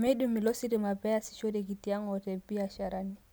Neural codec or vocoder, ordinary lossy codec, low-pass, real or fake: none; none; none; real